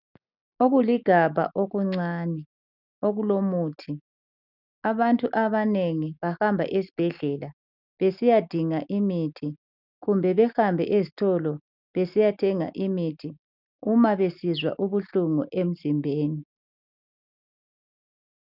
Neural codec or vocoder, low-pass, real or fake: none; 5.4 kHz; real